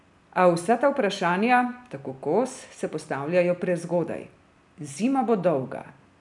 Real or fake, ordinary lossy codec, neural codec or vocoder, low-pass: real; none; none; 10.8 kHz